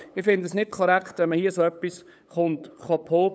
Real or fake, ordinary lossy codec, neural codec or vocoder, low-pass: fake; none; codec, 16 kHz, 8 kbps, FunCodec, trained on LibriTTS, 25 frames a second; none